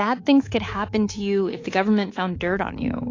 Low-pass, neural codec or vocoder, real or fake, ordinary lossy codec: 7.2 kHz; codec, 24 kHz, 3.1 kbps, DualCodec; fake; AAC, 32 kbps